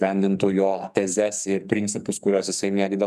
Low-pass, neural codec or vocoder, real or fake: 14.4 kHz; codec, 44.1 kHz, 2.6 kbps, SNAC; fake